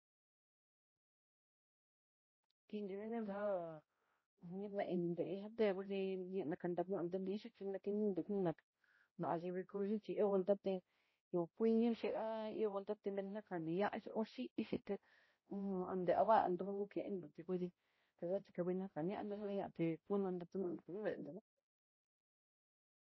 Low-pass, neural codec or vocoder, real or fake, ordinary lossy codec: 7.2 kHz; codec, 16 kHz, 0.5 kbps, X-Codec, HuBERT features, trained on balanced general audio; fake; MP3, 24 kbps